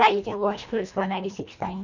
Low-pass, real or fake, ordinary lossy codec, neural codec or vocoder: 7.2 kHz; fake; none; codec, 24 kHz, 1.5 kbps, HILCodec